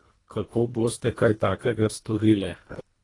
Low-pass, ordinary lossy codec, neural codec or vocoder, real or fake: 10.8 kHz; AAC, 32 kbps; codec, 24 kHz, 1.5 kbps, HILCodec; fake